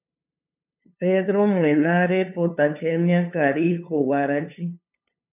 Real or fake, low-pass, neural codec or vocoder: fake; 3.6 kHz; codec, 16 kHz, 2 kbps, FunCodec, trained on LibriTTS, 25 frames a second